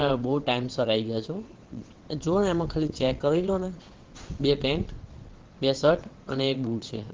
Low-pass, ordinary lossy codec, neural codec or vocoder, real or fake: 7.2 kHz; Opus, 16 kbps; vocoder, 22.05 kHz, 80 mel bands, WaveNeXt; fake